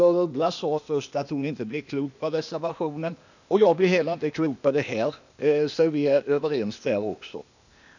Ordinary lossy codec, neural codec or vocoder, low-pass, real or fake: none; codec, 16 kHz, 0.8 kbps, ZipCodec; 7.2 kHz; fake